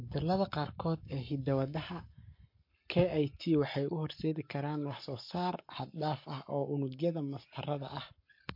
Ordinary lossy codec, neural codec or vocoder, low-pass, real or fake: MP3, 32 kbps; codec, 44.1 kHz, 7.8 kbps, Pupu-Codec; 5.4 kHz; fake